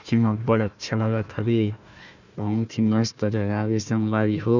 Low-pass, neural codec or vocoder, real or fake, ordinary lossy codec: 7.2 kHz; codec, 16 kHz, 1 kbps, FunCodec, trained on Chinese and English, 50 frames a second; fake; none